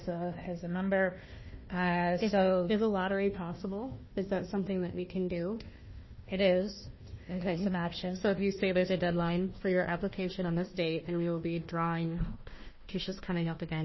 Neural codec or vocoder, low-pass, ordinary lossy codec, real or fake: codec, 16 kHz, 1 kbps, FunCodec, trained on Chinese and English, 50 frames a second; 7.2 kHz; MP3, 24 kbps; fake